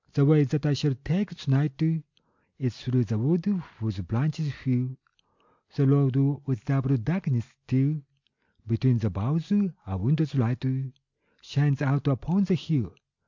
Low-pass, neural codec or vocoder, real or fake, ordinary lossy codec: 7.2 kHz; none; real; MP3, 64 kbps